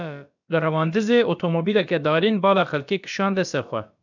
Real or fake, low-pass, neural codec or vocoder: fake; 7.2 kHz; codec, 16 kHz, about 1 kbps, DyCAST, with the encoder's durations